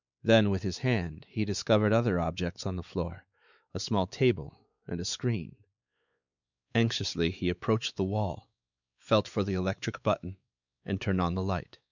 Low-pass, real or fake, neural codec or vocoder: 7.2 kHz; fake; codec, 16 kHz, 4 kbps, X-Codec, WavLM features, trained on Multilingual LibriSpeech